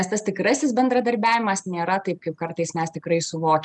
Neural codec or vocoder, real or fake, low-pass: none; real; 10.8 kHz